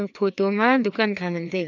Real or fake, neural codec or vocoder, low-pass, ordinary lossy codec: fake; codec, 16 kHz, 2 kbps, FreqCodec, larger model; 7.2 kHz; none